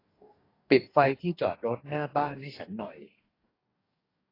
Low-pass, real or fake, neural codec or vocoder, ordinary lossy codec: 5.4 kHz; fake; codec, 44.1 kHz, 2.6 kbps, DAC; AAC, 24 kbps